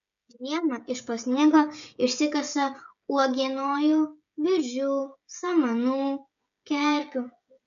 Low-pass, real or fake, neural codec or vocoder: 7.2 kHz; fake; codec, 16 kHz, 16 kbps, FreqCodec, smaller model